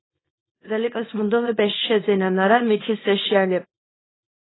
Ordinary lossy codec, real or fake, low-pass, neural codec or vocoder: AAC, 16 kbps; fake; 7.2 kHz; codec, 24 kHz, 0.9 kbps, WavTokenizer, small release